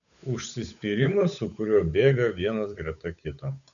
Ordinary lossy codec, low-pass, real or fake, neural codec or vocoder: MP3, 96 kbps; 7.2 kHz; fake; codec, 16 kHz, 8 kbps, FunCodec, trained on Chinese and English, 25 frames a second